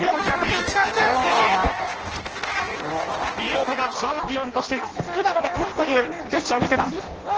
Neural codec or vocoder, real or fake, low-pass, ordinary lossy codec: codec, 16 kHz in and 24 kHz out, 0.6 kbps, FireRedTTS-2 codec; fake; 7.2 kHz; Opus, 16 kbps